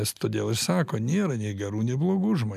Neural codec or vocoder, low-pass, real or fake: none; 14.4 kHz; real